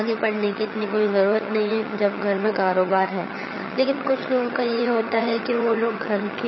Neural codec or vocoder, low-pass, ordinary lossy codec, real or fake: vocoder, 22.05 kHz, 80 mel bands, HiFi-GAN; 7.2 kHz; MP3, 24 kbps; fake